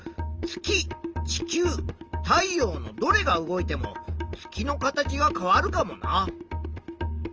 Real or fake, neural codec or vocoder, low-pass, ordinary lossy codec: real; none; 7.2 kHz; Opus, 24 kbps